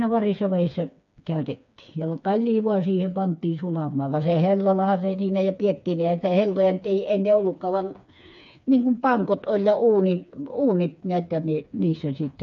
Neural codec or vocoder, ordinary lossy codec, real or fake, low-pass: codec, 16 kHz, 4 kbps, FreqCodec, smaller model; none; fake; 7.2 kHz